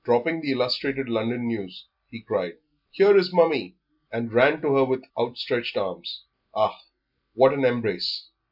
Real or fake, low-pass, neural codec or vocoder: real; 5.4 kHz; none